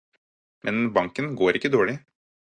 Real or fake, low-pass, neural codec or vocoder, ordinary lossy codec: real; 9.9 kHz; none; Opus, 64 kbps